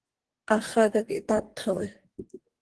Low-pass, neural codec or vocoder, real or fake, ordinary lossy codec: 10.8 kHz; codec, 44.1 kHz, 2.6 kbps, DAC; fake; Opus, 16 kbps